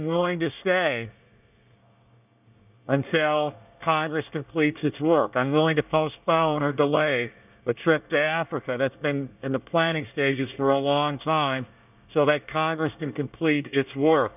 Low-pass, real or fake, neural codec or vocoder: 3.6 kHz; fake; codec, 24 kHz, 1 kbps, SNAC